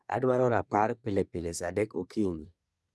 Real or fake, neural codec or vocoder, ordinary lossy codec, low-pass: fake; codec, 24 kHz, 1 kbps, SNAC; none; none